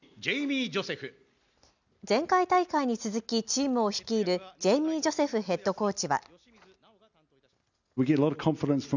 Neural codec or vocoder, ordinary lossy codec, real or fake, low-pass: none; none; real; 7.2 kHz